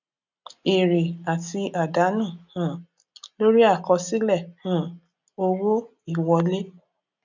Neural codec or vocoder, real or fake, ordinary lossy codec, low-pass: none; real; none; 7.2 kHz